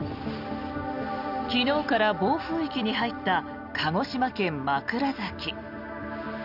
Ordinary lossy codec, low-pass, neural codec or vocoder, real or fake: none; 5.4 kHz; none; real